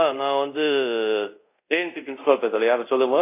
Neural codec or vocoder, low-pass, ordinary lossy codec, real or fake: codec, 24 kHz, 0.5 kbps, DualCodec; 3.6 kHz; AAC, 24 kbps; fake